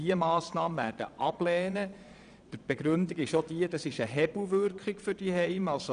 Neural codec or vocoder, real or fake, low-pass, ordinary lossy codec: vocoder, 22.05 kHz, 80 mel bands, Vocos; fake; 9.9 kHz; none